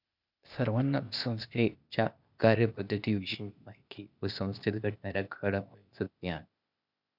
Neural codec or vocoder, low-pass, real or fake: codec, 16 kHz, 0.8 kbps, ZipCodec; 5.4 kHz; fake